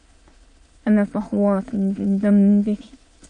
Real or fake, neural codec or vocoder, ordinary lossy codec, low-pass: fake; autoencoder, 22.05 kHz, a latent of 192 numbers a frame, VITS, trained on many speakers; MP3, 48 kbps; 9.9 kHz